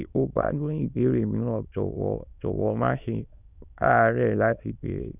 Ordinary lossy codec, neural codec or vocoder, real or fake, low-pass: none; autoencoder, 22.05 kHz, a latent of 192 numbers a frame, VITS, trained on many speakers; fake; 3.6 kHz